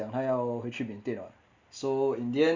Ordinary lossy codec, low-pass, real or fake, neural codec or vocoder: Opus, 64 kbps; 7.2 kHz; real; none